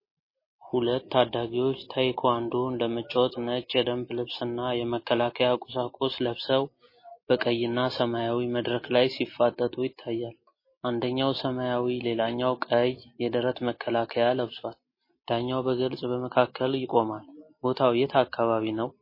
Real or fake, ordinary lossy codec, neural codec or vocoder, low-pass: real; MP3, 24 kbps; none; 5.4 kHz